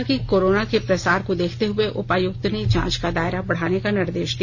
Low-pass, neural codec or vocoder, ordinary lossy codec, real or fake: none; none; none; real